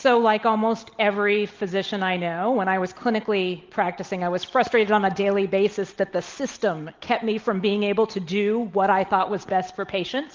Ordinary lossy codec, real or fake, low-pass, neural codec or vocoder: Opus, 24 kbps; real; 7.2 kHz; none